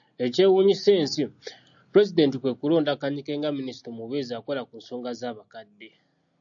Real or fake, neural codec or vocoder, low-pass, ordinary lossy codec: real; none; 7.2 kHz; AAC, 64 kbps